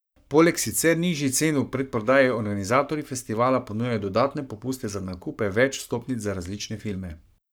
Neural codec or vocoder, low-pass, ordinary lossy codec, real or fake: codec, 44.1 kHz, 7.8 kbps, Pupu-Codec; none; none; fake